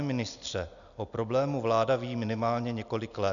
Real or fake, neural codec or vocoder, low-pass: real; none; 7.2 kHz